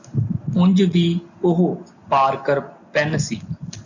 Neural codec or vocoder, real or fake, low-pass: none; real; 7.2 kHz